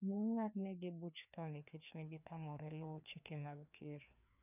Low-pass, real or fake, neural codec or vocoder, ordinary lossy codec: 3.6 kHz; fake; codec, 16 kHz, 2 kbps, FreqCodec, larger model; none